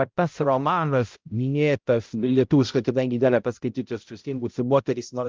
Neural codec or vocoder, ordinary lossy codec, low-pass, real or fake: codec, 16 kHz, 0.5 kbps, X-Codec, HuBERT features, trained on balanced general audio; Opus, 32 kbps; 7.2 kHz; fake